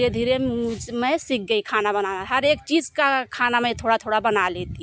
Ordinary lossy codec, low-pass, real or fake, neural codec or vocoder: none; none; real; none